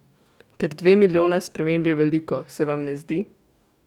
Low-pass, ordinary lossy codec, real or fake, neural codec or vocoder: 19.8 kHz; none; fake; codec, 44.1 kHz, 2.6 kbps, DAC